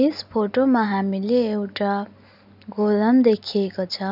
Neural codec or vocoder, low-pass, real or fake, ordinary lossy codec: none; 5.4 kHz; real; none